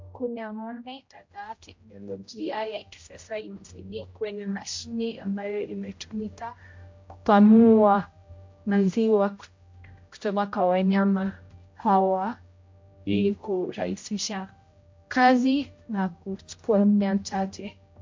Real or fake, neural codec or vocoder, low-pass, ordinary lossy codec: fake; codec, 16 kHz, 0.5 kbps, X-Codec, HuBERT features, trained on general audio; 7.2 kHz; MP3, 48 kbps